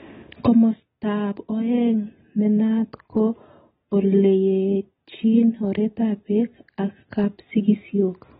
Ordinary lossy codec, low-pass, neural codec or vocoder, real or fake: AAC, 16 kbps; 7.2 kHz; none; real